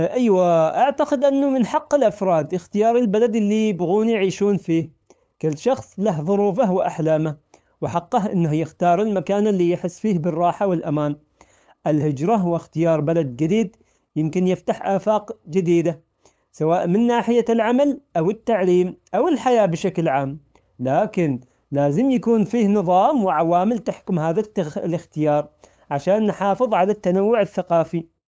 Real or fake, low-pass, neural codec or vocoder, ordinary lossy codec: fake; none; codec, 16 kHz, 8 kbps, FunCodec, trained on LibriTTS, 25 frames a second; none